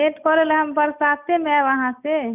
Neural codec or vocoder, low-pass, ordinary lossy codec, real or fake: none; 3.6 kHz; none; real